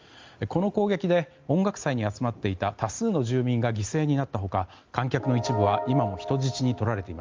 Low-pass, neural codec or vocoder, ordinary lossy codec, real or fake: 7.2 kHz; none; Opus, 32 kbps; real